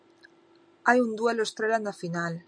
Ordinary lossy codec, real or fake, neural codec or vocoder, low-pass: MP3, 96 kbps; real; none; 9.9 kHz